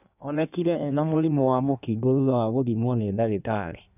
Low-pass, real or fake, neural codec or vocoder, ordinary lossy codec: 3.6 kHz; fake; codec, 16 kHz in and 24 kHz out, 1.1 kbps, FireRedTTS-2 codec; none